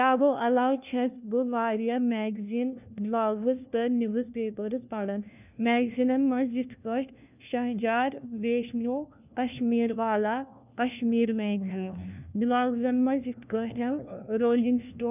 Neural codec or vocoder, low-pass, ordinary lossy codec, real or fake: codec, 16 kHz, 1 kbps, FunCodec, trained on LibriTTS, 50 frames a second; 3.6 kHz; none; fake